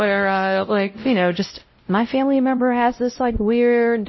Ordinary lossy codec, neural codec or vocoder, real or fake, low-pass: MP3, 24 kbps; codec, 16 kHz, 0.5 kbps, X-Codec, WavLM features, trained on Multilingual LibriSpeech; fake; 7.2 kHz